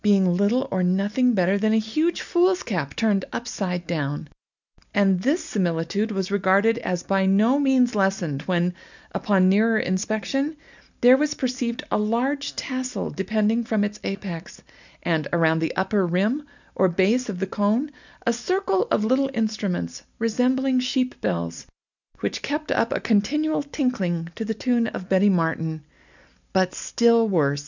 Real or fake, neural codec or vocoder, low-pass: real; none; 7.2 kHz